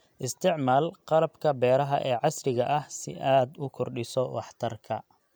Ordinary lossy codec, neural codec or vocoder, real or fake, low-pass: none; none; real; none